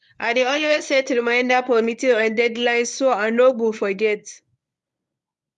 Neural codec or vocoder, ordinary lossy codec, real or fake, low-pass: codec, 24 kHz, 0.9 kbps, WavTokenizer, medium speech release version 1; none; fake; none